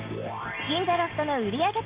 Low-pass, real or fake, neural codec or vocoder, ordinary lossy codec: 3.6 kHz; real; none; Opus, 24 kbps